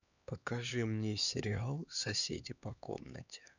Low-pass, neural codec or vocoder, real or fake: 7.2 kHz; codec, 16 kHz, 2 kbps, X-Codec, HuBERT features, trained on LibriSpeech; fake